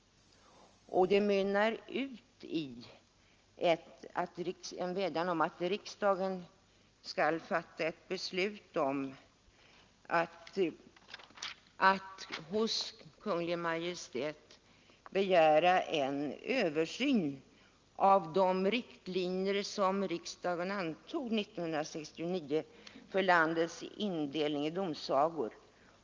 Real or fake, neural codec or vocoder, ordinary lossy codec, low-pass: real; none; Opus, 24 kbps; 7.2 kHz